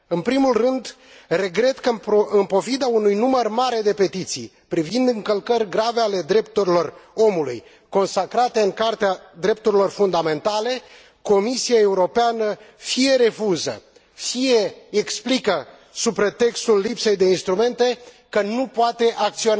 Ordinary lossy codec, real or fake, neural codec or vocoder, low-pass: none; real; none; none